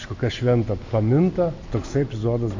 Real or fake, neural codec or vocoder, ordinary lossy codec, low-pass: real; none; AAC, 48 kbps; 7.2 kHz